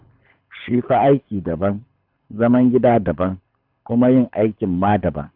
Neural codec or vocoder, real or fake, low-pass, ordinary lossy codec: codec, 24 kHz, 6 kbps, HILCodec; fake; 5.4 kHz; none